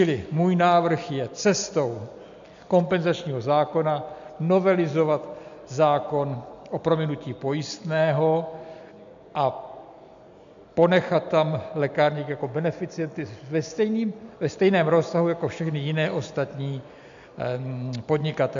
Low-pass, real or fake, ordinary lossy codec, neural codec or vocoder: 7.2 kHz; real; MP3, 64 kbps; none